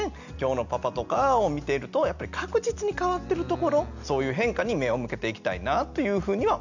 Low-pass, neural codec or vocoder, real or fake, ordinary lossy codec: 7.2 kHz; none; real; none